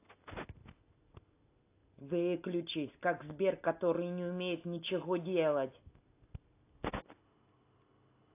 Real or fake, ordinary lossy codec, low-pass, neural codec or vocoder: real; AAC, 24 kbps; 3.6 kHz; none